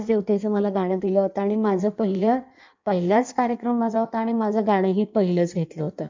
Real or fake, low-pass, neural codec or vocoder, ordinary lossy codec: fake; 7.2 kHz; codec, 16 kHz in and 24 kHz out, 1.1 kbps, FireRedTTS-2 codec; MP3, 64 kbps